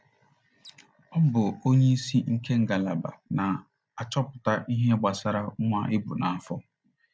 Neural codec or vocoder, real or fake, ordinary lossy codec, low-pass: none; real; none; none